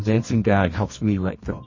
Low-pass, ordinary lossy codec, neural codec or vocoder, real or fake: 7.2 kHz; MP3, 32 kbps; codec, 24 kHz, 0.9 kbps, WavTokenizer, medium music audio release; fake